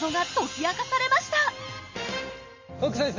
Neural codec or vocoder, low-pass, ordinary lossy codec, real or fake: none; 7.2 kHz; MP3, 32 kbps; real